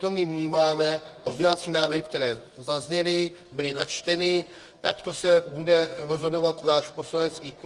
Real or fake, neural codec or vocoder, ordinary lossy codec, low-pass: fake; codec, 24 kHz, 0.9 kbps, WavTokenizer, medium music audio release; Opus, 32 kbps; 10.8 kHz